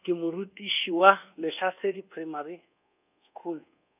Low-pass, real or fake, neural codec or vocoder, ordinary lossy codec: 3.6 kHz; fake; codec, 24 kHz, 1.2 kbps, DualCodec; none